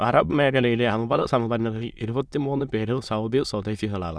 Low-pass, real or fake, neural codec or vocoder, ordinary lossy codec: none; fake; autoencoder, 22.05 kHz, a latent of 192 numbers a frame, VITS, trained on many speakers; none